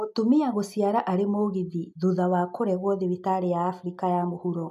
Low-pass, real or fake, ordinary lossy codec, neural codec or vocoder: 14.4 kHz; real; MP3, 96 kbps; none